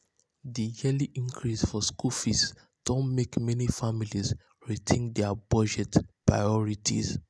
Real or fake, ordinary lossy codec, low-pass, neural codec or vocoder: real; none; none; none